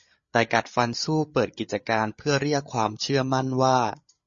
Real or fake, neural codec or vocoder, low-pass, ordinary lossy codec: fake; codec, 16 kHz, 8 kbps, FreqCodec, larger model; 7.2 kHz; MP3, 32 kbps